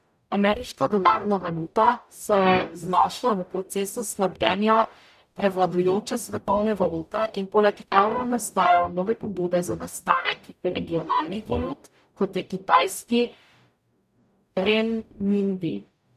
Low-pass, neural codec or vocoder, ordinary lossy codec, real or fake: 14.4 kHz; codec, 44.1 kHz, 0.9 kbps, DAC; none; fake